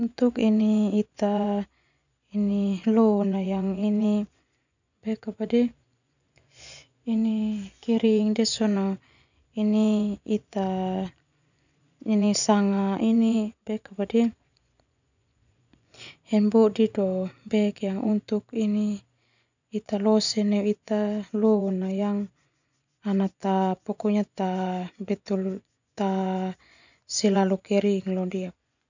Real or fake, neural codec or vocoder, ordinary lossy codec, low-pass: fake; vocoder, 24 kHz, 100 mel bands, Vocos; none; 7.2 kHz